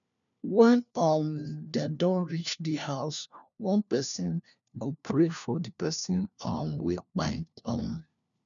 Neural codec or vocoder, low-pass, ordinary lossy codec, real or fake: codec, 16 kHz, 1 kbps, FunCodec, trained on LibriTTS, 50 frames a second; 7.2 kHz; none; fake